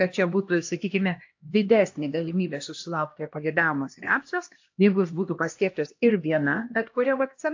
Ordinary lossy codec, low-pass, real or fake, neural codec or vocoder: AAC, 48 kbps; 7.2 kHz; fake; codec, 16 kHz, 1 kbps, X-Codec, HuBERT features, trained on LibriSpeech